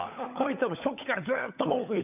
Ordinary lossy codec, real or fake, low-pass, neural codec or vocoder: none; fake; 3.6 kHz; codec, 16 kHz, 16 kbps, FunCodec, trained on LibriTTS, 50 frames a second